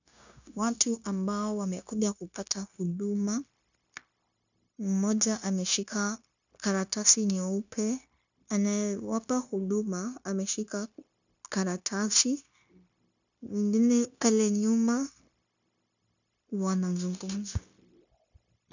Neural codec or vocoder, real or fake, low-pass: codec, 16 kHz, 0.9 kbps, LongCat-Audio-Codec; fake; 7.2 kHz